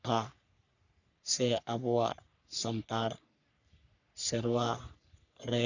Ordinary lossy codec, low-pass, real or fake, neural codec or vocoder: none; 7.2 kHz; fake; codec, 44.1 kHz, 3.4 kbps, Pupu-Codec